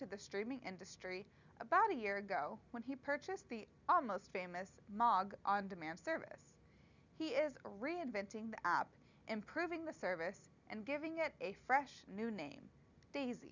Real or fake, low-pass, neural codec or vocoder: real; 7.2 kHz; none